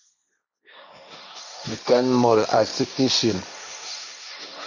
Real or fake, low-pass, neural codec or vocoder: fake; 7.2 kHz; codec, 16 kHz, 1.1 kbps, Voila-Tokenizer